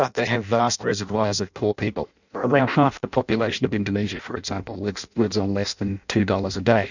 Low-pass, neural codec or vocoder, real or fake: 7.2 kHz; codec, 16 kHz in and 24 kHz out, 0.6 kbps, FireRedTTS-2 codec; fake